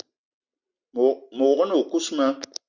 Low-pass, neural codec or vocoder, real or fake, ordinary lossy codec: 7.2 kHz; none; real; Opus, 64 kbps